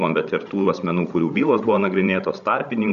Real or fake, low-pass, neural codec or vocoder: fake; 7.2 kHz; codec, 16 kHz, 16 kbps, FreqCodec, larger model